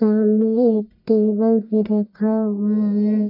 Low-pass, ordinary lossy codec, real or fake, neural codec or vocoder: 5.4 kHz; none; fake; codec, 44.1 kHz, 1.7 kbps, Pupu-Codec